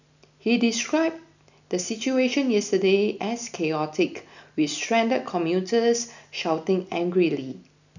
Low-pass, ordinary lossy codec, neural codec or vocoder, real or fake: 7.2 kHz; none; none; real